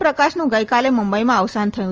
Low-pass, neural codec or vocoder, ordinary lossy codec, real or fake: 7.2 kHz; none; Opus, 24 kbps; real